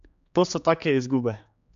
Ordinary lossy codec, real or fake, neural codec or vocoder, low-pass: MP3, 64 kbps; fake; codec, 16 kHz, 4 kbps, X-Codec, HuBERT features, trained on general audio; 7.2 kHz